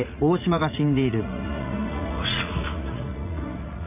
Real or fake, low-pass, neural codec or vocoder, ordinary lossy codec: fake; 3.6 kHz; vocoder, 44.1 kHz, 80 mel bands, Vocos; none